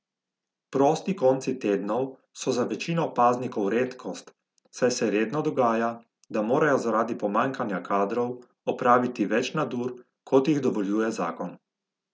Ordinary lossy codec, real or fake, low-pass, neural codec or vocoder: none; real; none; none